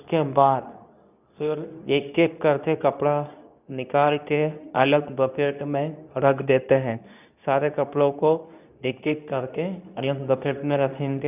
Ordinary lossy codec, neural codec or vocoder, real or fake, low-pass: none; codec, 24 kHz, 0.9 kbps, WavTokenizer, medium speech release version 2; fake; 3.6 kHz